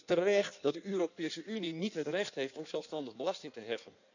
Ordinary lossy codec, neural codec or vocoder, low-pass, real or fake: none; codec, 16 kHz in and 24 kHz out, 1.1 kbps, FireRedTTS-2 codec; 7.2 kHz; fake